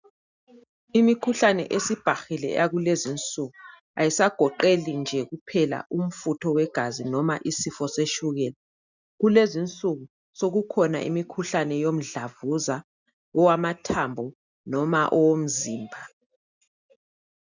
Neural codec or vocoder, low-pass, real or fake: none; 7.2 kHz; real